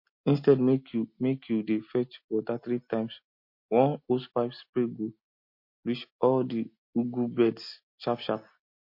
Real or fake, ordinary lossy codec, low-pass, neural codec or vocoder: real; MP3, 32 kbps; 5.4 kHz; none